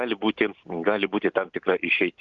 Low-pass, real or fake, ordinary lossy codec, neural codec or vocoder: 7.2 kHz; real; Opus, 32 kbps; none